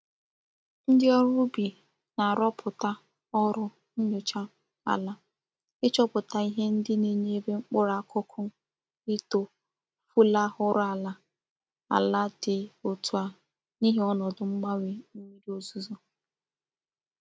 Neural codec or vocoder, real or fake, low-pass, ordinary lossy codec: none; real; none; none